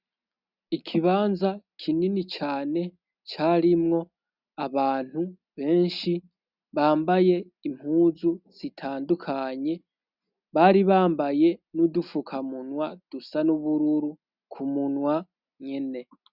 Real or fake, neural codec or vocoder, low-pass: real; none; 5.4 kHz